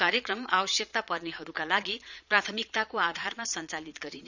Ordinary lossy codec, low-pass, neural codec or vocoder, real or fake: none; 7.2 kHz; vocoder, 22.05 kHz, 80 mel bands, Vocos; fake